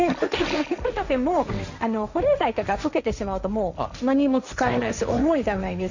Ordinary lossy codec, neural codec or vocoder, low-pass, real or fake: none; codec, 16 kHz, 1.1 kbps, Voila-Tokenizer; 7.2 kHz; fake